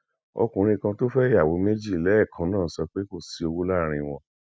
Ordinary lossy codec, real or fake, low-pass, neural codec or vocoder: none; real; none; none